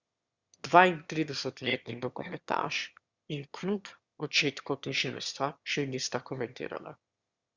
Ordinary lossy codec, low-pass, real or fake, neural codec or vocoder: Opus, 64 kbps; 7.2 kHz; fake; autoencoder, 22.05 kHz, a latent of 192 numbers a frame, VITS, trained on one speaker